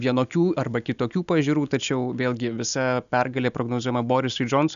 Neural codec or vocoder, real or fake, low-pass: none; real; 7.2 kHz